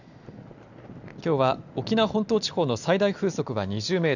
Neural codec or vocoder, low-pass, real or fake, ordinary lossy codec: none; 7.2 kHz; real; none